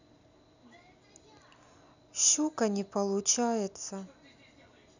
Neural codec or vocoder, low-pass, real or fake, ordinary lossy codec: none; 7.2 kHz; real; none